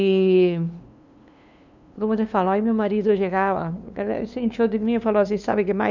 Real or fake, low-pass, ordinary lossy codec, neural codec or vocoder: fake; 7.2 kHz; none; codec, 16 kHz, 2 kbps, FunCodec, trained on LibriTTS, 25 frames a second